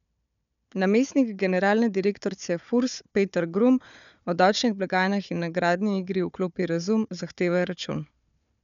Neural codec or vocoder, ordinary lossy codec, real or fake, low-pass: codec, 16 kHz, 4 kbps, FunCodec, trained on Chinese and English, 50 frames a second; none; fake; 7.2 kHz